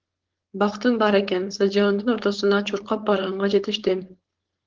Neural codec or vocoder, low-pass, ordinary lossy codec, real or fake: codec, 16 kHz, 4.8 kbps, FACodec; 7.2 kHz; Opus, 16 kbps; fake